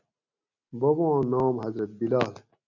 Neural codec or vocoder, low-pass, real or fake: none; 7.2 kHz; real